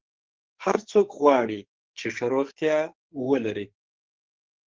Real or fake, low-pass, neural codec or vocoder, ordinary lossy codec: fake; 7.2 kHz; codec, 44.1 kHz, 2.6 kbps, SNAC; Opus, 16 kbps